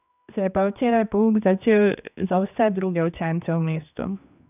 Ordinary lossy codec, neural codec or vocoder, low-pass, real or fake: none; codec, 16 kHz, 2 kbps, X-Codec, HuBERT features, trained on general audio; 3.6 kHz; fake